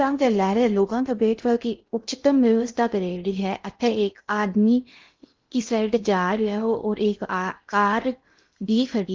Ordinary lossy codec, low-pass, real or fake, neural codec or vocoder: Opus, 32 kbps; 7.2 kHz; fake; codec, 16 kHz in and 24 kHz out, 0.6 kbps, FocalCodec, streaming, 2048 codes